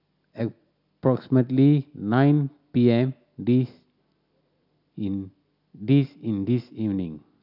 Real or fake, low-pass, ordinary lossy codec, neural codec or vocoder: real; 5.4 kHz; none; none